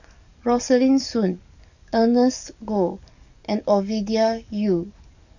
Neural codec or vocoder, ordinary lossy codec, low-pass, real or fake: codec, 44.1 kHz, 7.8 kbps, DAC; none; 7.2 kHz; fake